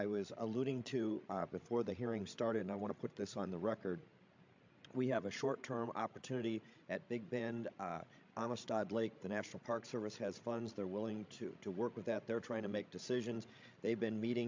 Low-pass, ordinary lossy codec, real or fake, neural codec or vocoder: 7.2 kHz; MP3, 64 kbps; fake; codec, 16 kHz, 16 kbps, FreqCodec, larger model